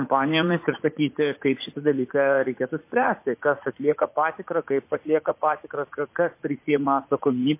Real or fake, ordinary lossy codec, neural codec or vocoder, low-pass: fake; MP3, 24 kbps; vocoder, 44.1 kHz, 80 mel bands, Vocos; 3.6 kHz